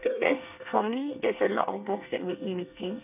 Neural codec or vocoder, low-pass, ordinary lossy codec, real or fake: codec, 24 kHz, 1 kbps, SNAC; 3.6 kHz; none; fake